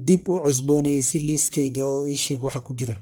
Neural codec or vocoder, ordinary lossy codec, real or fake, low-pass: codec, 44.1 kHz, 1.7 kbps, Pupu-Codec; none; fake; none